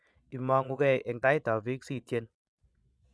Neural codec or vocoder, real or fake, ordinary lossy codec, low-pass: vocoder, 22.05 kHz, 80 mel bands, Vocos; fake; none; none